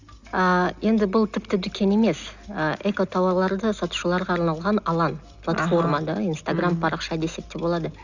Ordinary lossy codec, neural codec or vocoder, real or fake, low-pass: Opus, 64 kbps; none; real; 7.2 kHz